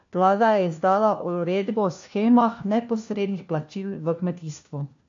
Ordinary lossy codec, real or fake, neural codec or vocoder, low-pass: AAC, 48 kbps; fake; codec, 16 kHz, 1 kbps, FunCodec, trained on LibriTTS, 50 frames a second; 7.2 kHz